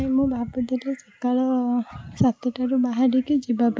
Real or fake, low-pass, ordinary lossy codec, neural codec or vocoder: real; none; none; none